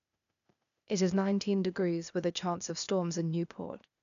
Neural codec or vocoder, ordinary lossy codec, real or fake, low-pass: codec, 16 kHz, 0.8 kbps, ZipCodec; none; fake; 7.2 kHz